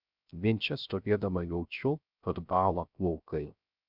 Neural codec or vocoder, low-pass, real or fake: codec, 16 kHz, 0.3 kbps, FocalCodec; 5.4 kHz; fake